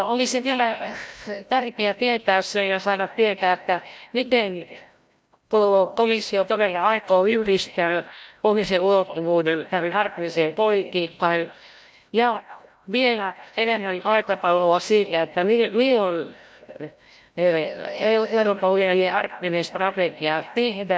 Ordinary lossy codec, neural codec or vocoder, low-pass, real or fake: none; codec, 16 kHz, 0.5 kbps, FreqCodec, larger model; none; fake